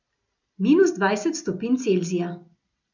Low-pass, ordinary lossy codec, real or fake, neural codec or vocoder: 7.2 kHz; none; real; none